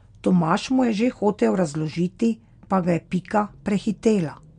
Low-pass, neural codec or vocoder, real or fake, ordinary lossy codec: 9.9 kHz; none; real; MP3, 64 kbps